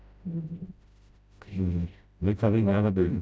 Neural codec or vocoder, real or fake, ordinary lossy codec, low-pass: codec, 16 kHz, 0.5 kbps, FreqCodec, smaller model; fake; none; none